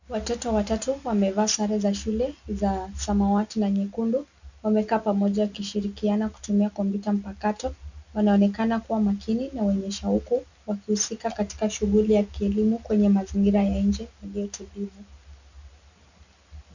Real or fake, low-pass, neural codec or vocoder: real; 7.2 kHz; none